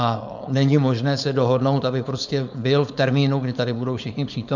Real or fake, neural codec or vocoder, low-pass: fake; codec, 16 kHz, 4.8 kbps, FACodec; 7.2 kHz